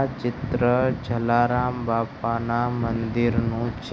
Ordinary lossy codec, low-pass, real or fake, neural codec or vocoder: none; none; real; none